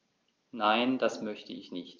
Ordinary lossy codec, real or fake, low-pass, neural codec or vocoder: Opus, 24 kbps; real; 7.2 kHz; none